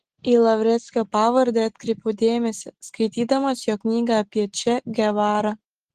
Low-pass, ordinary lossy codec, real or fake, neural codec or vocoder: 14.4 kHz; Opus, 16 kbps; real; none